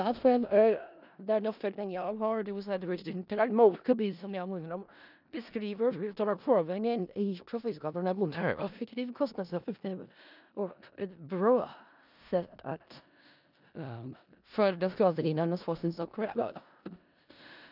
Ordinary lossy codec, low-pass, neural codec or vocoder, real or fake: none; 5.4 kHz; codec, 16 kHz in and 24 kHz out, 0.4 kbps, LongCat-Audio-Codec, four codebook decoder; fake